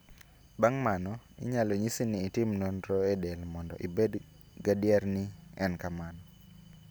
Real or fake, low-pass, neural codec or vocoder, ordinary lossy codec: real; none; none; none